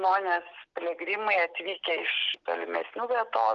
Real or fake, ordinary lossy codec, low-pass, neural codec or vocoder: real; Opus, 32 kbps; 7.2 kHz; none